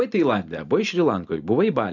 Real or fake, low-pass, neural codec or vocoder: real; 7.2 kHz; none